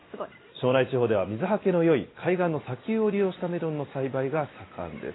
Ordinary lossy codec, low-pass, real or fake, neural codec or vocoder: AAC, 16 kbps; 7.2 kHz; real; none